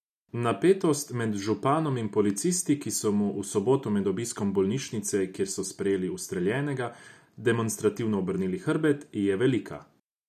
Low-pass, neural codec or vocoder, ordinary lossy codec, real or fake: 14.4 kHz; none; none; real